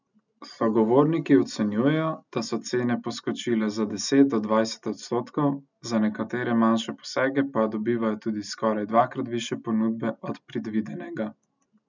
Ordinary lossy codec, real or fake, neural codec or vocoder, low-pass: none; real; none; 7.2 kHz